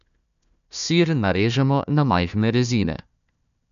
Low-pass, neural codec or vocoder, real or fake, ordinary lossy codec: 7.2 kHz; codec, 16 kHz, 1 kbps, FunCodec, trained on Chinese and English, 50 frames a second; fake; none